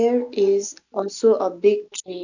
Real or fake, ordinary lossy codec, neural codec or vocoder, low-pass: real; none; none; 7.2 kHz